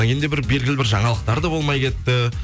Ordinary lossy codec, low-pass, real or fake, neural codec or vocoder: none; none; real; none